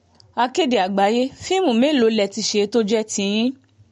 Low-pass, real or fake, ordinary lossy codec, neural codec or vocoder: 19.8 kHz; real; MP3, 48 kbps; none